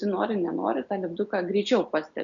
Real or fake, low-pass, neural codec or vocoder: real; 7.2 kHz; none